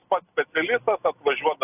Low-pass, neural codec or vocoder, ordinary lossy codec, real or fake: 3.6 kHz; none; AAC, 32 kbps; real